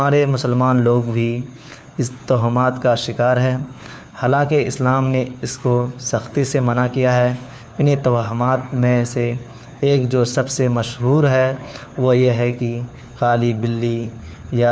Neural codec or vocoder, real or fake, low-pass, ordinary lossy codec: codec, 16 kHz, 4 kbps, FunCodec, trained on LibriTTS, 50 frames a second; fake; none; none